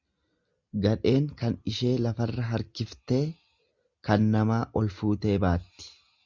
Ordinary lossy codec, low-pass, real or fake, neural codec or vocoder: Opus, 64 kbps; 7.2 kHz; real; none